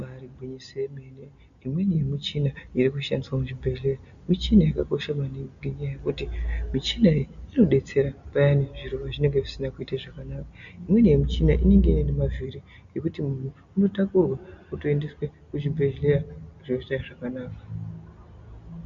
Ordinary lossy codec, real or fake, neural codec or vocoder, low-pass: MP3, 96 kbps; real; none; 7.2 kHz